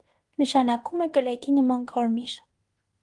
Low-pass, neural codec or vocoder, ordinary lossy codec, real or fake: 10.8 kHz; codec, 16 kHz in and 24 kHz out, 0.9 kbps, LongCat-Audio-Codec, fine tuned four codebook decoder; Opus, 24 kbps; fake